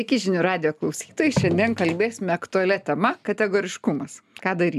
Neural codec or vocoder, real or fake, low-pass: none; real; 14.4 kHz